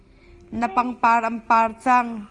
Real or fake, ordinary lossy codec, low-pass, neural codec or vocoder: real; Opus, 24 kbps; 10.8 kHz; none